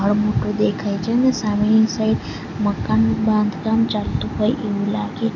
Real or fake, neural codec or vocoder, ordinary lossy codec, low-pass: real; none; none; 7.2 kHz